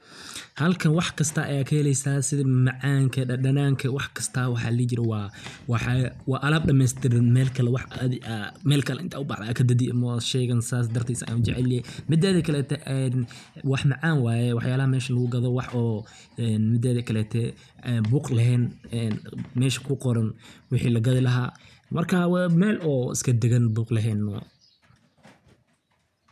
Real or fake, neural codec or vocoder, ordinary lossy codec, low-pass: real; none; none; 14.4 kHz